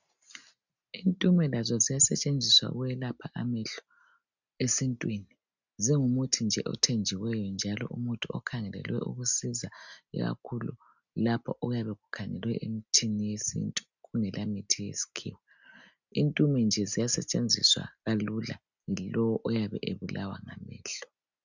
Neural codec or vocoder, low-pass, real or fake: none; 7.2 kHz; real